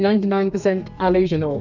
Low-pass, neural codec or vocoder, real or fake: 7.2 kHz; codec, 32 kHz, 1.9 kbps, SNAC; fake